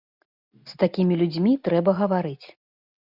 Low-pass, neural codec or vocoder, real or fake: 5.4 kHz; none; real